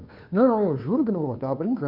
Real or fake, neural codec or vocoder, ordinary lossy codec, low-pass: fake; codec, 16 kHz, 2 kbps, FunCodec, trained on Chinese and English, 25 frames a second; none; 5.4 kHz